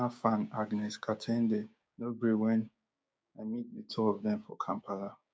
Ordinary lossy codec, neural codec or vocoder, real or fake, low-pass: none; codec, 16 kHz, 6 kbps, DAC; fake; none